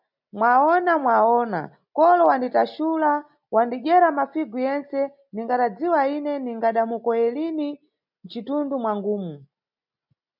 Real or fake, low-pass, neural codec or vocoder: real; 5.4 kHz; none